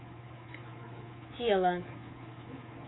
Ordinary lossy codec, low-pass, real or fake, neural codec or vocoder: AAC, 16 kbps; 7.2 kHz; fake; codec, 24 kHz, 3.1 kbps, DualCodec